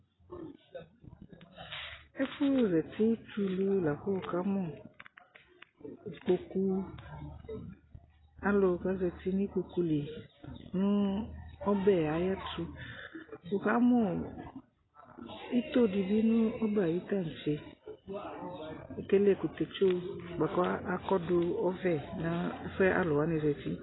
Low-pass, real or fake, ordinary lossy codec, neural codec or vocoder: 7.2 kHz; real; AAC, 16 kbps; none